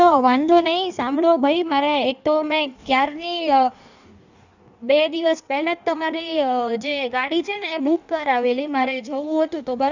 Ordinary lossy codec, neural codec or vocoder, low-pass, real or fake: none; codec, 16 kHz in and 24 kHz out, 1.1 kbps, FireRedTTS-2 codec; 7.2 kHz; fake